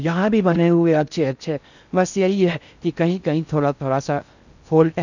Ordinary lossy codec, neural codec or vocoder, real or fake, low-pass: none; codec, 16 kHz in and 24 kHz out, 0.6 kbps, FocalCodec, streaming, 4096 codes; fake; 7.2 kHz